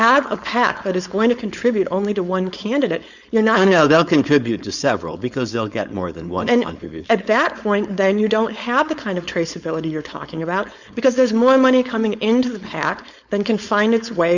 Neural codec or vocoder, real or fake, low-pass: codec, 16 kHz, 4.8 kbps, FACodec; fake; 7.2 kHz